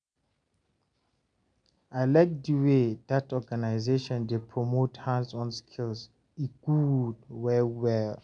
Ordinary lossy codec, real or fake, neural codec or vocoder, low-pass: MP3, 96 kbps; real; none; 9.9 kHz